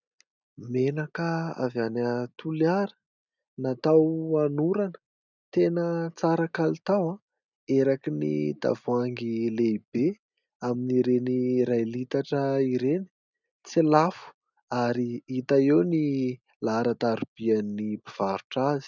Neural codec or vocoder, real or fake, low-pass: none; real; 7.2 kHz